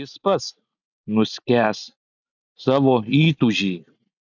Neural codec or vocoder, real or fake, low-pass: none; real; 7.2 kHz